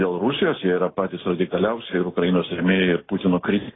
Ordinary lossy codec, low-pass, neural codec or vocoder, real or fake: AAC, 16 kbps; 7.2 kHz; none; real